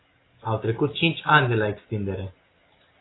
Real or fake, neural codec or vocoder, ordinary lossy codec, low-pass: real; none; AAC, 16 kbps; 7.2 kHz